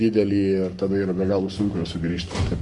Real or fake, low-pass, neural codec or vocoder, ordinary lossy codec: fake; 10.8 kHz; codec, 44.1 kHz, 7.8 kbps, Pupu-Codec; MP3, 64 kbps